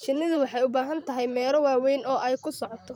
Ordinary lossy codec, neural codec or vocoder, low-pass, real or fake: none; vocoder, 44.1 kHz, 128 mel bands, Pupu-Vocoder; 19.8 kHz; fake